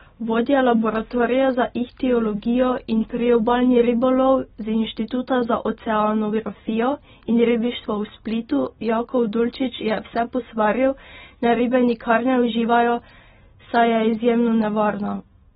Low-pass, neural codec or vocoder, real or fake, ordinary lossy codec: 19.8 kHz; none; real; AAC, 16 kbps